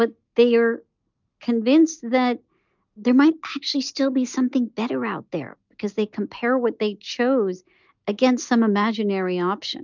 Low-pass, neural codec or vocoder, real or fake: 7.2 kHz; none; real